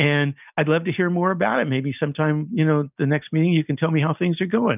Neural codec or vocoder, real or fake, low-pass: none; real; 3.6 kHz